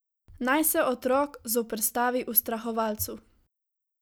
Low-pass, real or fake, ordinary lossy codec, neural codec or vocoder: none; real; none; none